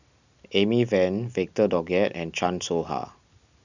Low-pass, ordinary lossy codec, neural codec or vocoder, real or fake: 7.2 kHz; none; none; real